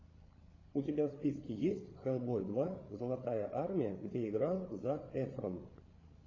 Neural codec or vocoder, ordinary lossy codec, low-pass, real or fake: codec, 16 kHz, 8 kbps, FreqCodec, larger model; AAC, 32 kbps; 7.2 kHz; fake